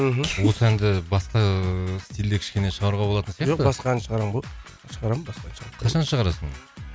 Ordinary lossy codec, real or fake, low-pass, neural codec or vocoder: none; real; none; none